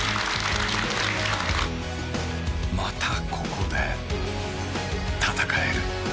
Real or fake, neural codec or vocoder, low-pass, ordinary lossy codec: real; none; none; none